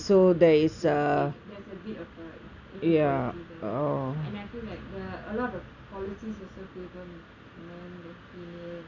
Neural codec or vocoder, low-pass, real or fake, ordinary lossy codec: none; 7.2 kHz; real; none